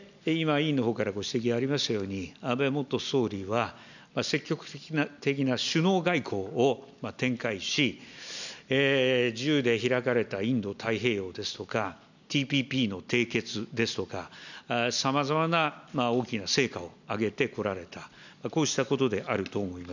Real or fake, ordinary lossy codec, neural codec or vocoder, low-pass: real; none; none; 7.2 kHz